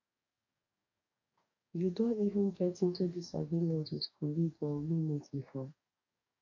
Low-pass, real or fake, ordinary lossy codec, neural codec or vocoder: 7.2 kHz; fake; none; codec, 44.1 kHz, 2.6 kbps, DAC